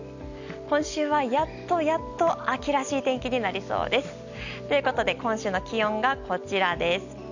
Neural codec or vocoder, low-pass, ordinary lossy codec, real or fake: none; 7.2 kHz; none; real